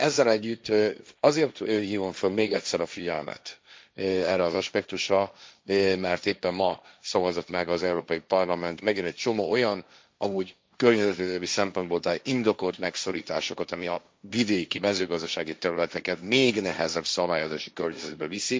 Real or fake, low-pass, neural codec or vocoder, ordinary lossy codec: fake; none; codec, 16 kHz, 1.1 kbps, Voila-Tokenizer; none